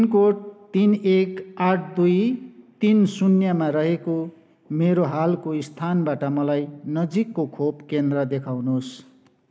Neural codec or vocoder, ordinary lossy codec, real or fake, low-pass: none; none; real; none